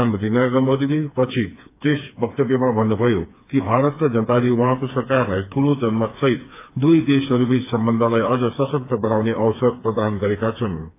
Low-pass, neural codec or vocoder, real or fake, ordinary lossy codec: 3.6 kHz; codec, 16 kHz, 4 kbps, FreqCodec, smaller model; fake; AAC, 24 kbps